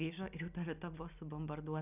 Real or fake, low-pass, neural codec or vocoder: real; 3.6 kHz; none